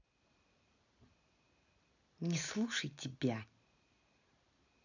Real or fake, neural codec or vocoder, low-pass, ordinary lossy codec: real; none; 7.2 kHz; none